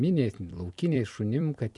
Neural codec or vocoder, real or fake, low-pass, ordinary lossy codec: vocoder, 44.1 kHz, 128 mel bands every 256 samples, BigVGAN v2; fake; 10.8 kHz; MP3, 96 kbps